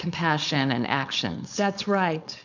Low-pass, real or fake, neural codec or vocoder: 7.2 kHz; fake; codec, 16 kHz, 4.8 kbps, FACodec